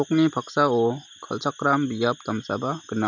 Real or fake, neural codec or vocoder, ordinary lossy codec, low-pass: real; none; none; 7.2 kHz